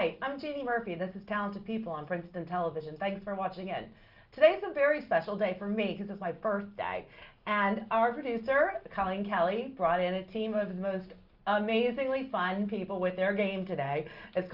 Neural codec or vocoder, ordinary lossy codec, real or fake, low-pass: none; Opus, 24 kbps; real; 5.4 kHz